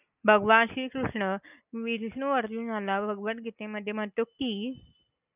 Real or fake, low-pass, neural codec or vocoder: real; 3.6 kHz; none